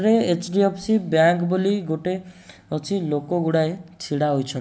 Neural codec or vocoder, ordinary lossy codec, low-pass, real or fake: none; none; none; real